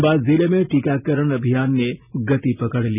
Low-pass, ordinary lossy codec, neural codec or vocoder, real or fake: 3.6 kHz; none; none; real